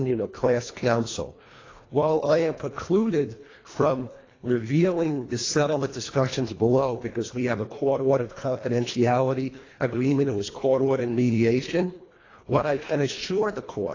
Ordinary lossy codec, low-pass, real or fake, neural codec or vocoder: AAC, 32 kbps; 7.2 kHz; fake; codec, 24 kHz, 1.5 kbps, HILCodec